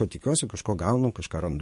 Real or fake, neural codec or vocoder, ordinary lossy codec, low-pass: fake; codec, 44.1 kHz, 7.8 kbps, DAC; MP3, 48 kbps; 14.4 kHz